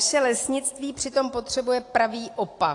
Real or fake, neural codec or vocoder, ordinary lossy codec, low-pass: real; none; AAC, 48 kbps; 10.8 kHz